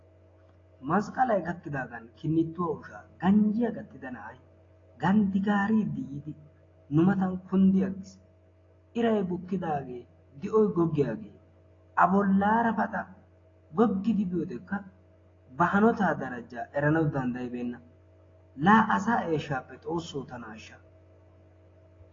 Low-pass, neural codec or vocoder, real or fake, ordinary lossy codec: 7.2 kHz; none; real; AAC, 32 kbps